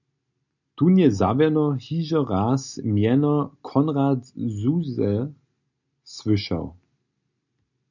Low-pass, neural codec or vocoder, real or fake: 7.2 kHz; none; real